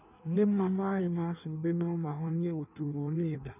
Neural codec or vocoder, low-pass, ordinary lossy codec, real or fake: codec, 16 kHz in and 24 kHz out, 1.1 kbps, FireRedTTS-2 codec; 3.6 kHz; none; fake